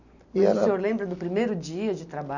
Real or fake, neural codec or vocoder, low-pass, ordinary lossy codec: real; none; 7.2 kHz; none